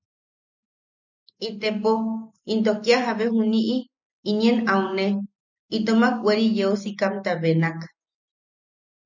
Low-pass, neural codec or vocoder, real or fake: 7.2 kHz; none; real